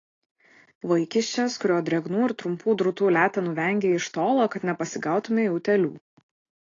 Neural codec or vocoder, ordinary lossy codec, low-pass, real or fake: none; AAC, 32 kbps; 7.2 kHz; real